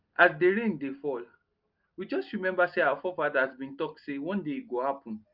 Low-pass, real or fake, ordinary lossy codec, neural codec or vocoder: 5.4 kHz; real; Opus, 24 kbps; none